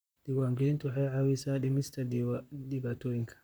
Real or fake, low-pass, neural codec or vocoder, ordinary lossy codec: fake; none; vocoder, 44.1 kHz, 128 mel bands, Pupu-Vocoder; none